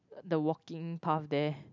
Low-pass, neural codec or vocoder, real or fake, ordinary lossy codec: 7.2 kHz; none; real; none